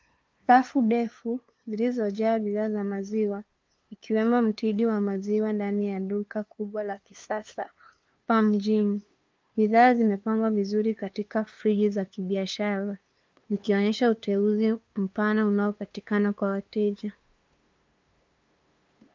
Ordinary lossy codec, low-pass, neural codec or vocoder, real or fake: Opus, 24 kbps; 7.2 kHz; codec, 16 kHz, 2 kbps, FunCodec, trained on LibriTTS, 25 frames a second; fake